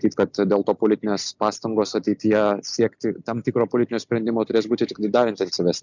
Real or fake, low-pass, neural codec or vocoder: real; 7.2 kHz; none